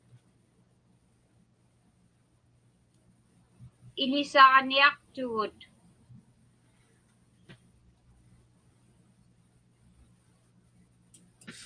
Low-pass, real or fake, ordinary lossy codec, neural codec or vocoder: 9.9 kHz; real; Opus, 32 kbps; none